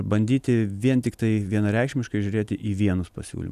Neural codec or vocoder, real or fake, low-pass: none; real; 14.4 kHz